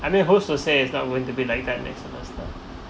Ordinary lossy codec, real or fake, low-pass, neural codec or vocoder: none; real; none; none